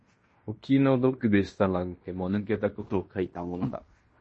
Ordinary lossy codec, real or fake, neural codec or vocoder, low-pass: MP3, 32 kbps; fake; codec, 16 kHz in and 24 kHz out, 0.9 kbps, LongCat-Audio-Codec, fine tuned four codebook decoder; 10.8 kHz